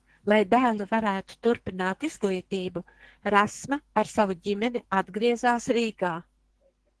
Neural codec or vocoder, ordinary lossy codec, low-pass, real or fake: codec, 44.1 kHz, 2.6 kbps, SNAC; Opus, 16 kbps; 10.8 kHz; fake